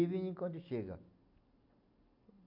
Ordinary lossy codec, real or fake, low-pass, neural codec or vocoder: MP3, 48 kbps; real; 5.4 kHz; none